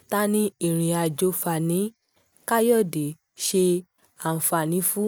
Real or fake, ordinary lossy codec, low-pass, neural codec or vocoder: real; none; none; none